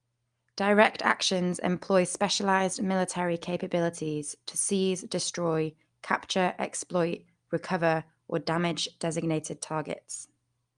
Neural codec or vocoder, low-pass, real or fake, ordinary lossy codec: none; 9.9 kHz; real; Opus, 32 kbps